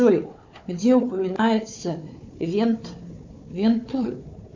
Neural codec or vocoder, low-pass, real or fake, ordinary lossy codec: codec, 16 kHz, 4 kbps, FunCodec, trained on Chinese and English, 50 frames a second; 7.2 kHz; fake; MP3, 64 kbps